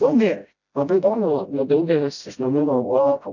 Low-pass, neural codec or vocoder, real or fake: 7.2 kHz; codec, 16 kHz, 0.5 kbps, FreqCodec, smaller model; fake